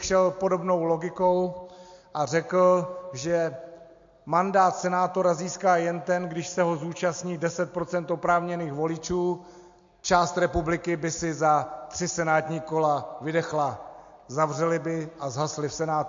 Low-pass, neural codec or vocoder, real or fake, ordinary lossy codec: 7.2 kHz; none; real; MP3, 48 kbps